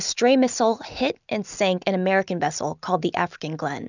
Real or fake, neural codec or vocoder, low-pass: real; none; 7.2 kHz